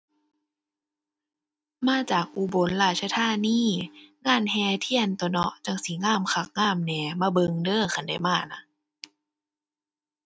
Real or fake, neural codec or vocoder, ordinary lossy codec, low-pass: real; none; none; none